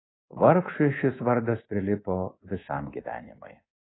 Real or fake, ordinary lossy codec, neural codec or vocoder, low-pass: fake; AAC, 16 kbps; codec, 24 kHz, 3.1 kbps, DualCodec; 7.2 kHz